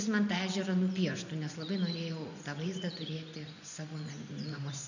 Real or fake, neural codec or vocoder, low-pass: fake; vocoder, 24 kHz, 100 mel bands, Vocos; 7.2 kHz